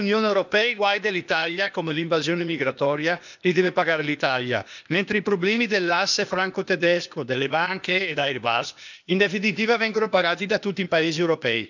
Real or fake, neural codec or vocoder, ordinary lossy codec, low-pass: fake; codec, 16 kHz, 0.8 kbps, ZipCodec; none; 7.2 kHz